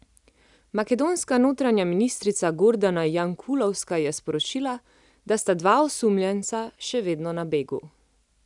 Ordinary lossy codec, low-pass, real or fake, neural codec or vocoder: none; 10.8 kHz; real; none